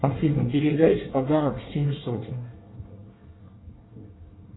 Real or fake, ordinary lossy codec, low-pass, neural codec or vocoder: fake; AAC, 16 kbps; 7.2 kHz; codec, 24 kHz, 1 kbps, SNAC